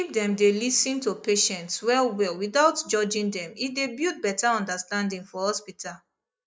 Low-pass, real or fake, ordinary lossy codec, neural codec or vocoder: none; real; none; none